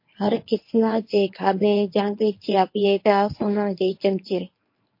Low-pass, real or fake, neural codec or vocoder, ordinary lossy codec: 5.4 kHz; fake; codec, 24 kHz, 0.9 kbps, WavTokenizer, medium speech release version 1; MP3, 32 kbps